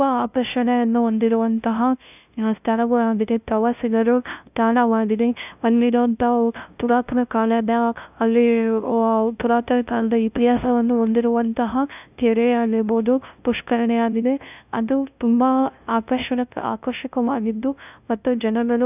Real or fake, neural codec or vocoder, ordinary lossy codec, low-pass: fake; codec, 16 kHz, 0.5 kbps, FunCodec, trained on LibriTTS, 25 frames a second; none; 3.6 kHz